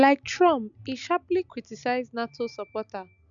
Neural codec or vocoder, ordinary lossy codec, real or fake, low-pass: none; none; real; 7.2 kHz